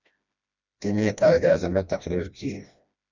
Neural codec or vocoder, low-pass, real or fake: codec, 16 kHz, 1 kbps, FreqCodec, smaller model; 7.2 kHz; fake